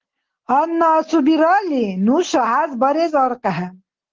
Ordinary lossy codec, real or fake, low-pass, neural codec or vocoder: Opus, 16 kbps; real; 7.2 kHz; none